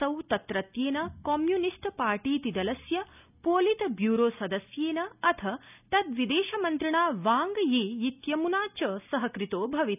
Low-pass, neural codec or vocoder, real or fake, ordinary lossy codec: 3.6 kHz; none; real; none